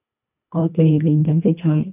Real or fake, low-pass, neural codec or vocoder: fake; 3.6 kHz; codec, 24 kHz, 1.5 kbps, HILCodec